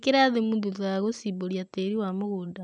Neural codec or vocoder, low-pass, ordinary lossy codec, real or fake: none; 9.9 kHz; none; real